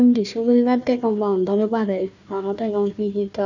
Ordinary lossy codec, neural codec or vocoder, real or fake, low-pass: none; codec, 16 kHz, 1 kbps, FunCodec, trained on Chinese and English, 50 frames a second; fake; 7.2 kHz